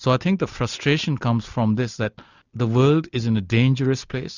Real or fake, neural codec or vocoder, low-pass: real; none; 7.2 kHz